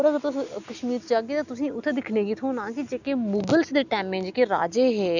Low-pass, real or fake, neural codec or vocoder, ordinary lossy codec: 7.2 kHz; real; none; none